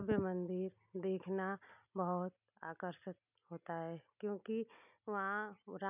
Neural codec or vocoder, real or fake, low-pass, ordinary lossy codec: none; real; 3.6 kHz; none